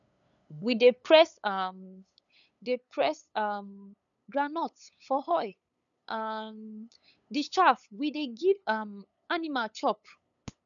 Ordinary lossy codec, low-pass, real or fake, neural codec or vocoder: none; 7.2 kHz; fake; codec, 16 kHz, 8 kbps, FunCodec, trained on LibriTTS, 25 frames a second